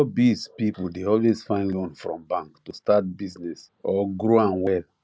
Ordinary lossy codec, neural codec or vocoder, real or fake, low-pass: none; none; real; none